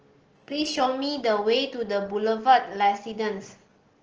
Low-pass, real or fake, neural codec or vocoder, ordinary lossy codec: 7.2 kHz; real; none; Opus, 16 kbps